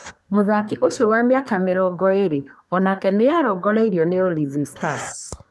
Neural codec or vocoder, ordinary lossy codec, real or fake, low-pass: codec, 24 kHz, 1 kbps, SNAC; none; fake; none